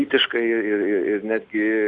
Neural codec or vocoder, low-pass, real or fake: none; 9.9 kHz; real